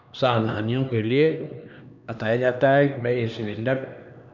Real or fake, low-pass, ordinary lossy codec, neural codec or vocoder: fake; 7.2 kHz; none; codec, 16 kHz, 2 kbps, X-Codec, HuBERT features, trained on LibriSpeech